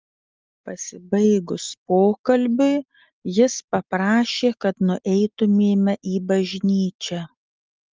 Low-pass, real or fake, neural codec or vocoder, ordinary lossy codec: 7.2 kHz; real; none; Opus, 32 kbps